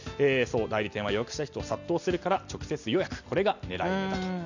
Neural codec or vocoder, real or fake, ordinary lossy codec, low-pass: none; real; none; 7.2 kHz